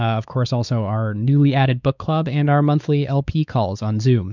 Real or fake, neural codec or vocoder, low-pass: fake; codec, 16 kHz, 4 kbps, X-Codec, WavLM features, trained on Multilingual LibriSpeech; 7.2 kHz